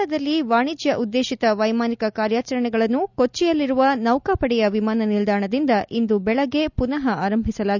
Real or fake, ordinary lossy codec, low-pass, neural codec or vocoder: real; none; 7.2 kHz; none